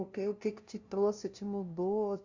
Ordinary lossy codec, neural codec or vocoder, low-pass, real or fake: Opus, 64 kbps; codec, 16 kHz, 0.5 kbps, FunCodec, trained on LibriTTS, 25 frames a second; 7.2 kHz; fake